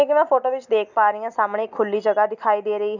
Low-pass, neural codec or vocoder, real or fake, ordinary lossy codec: 7.2 kHz; none; real; none